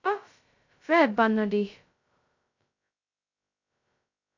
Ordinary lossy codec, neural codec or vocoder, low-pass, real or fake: MP3, 64 kbps; codec, 16 kHz, 0.2 kbps, FocalCodec; 7.2 kHz; fake